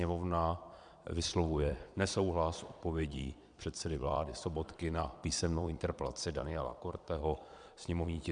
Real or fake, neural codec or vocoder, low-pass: fake; vocoder, 22.05 kHz, 80 mel bands, Vocos; 9.9 kHz